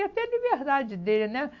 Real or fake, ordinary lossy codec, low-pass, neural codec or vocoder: real; MP3, 48 kbps; 7.2 kHz; none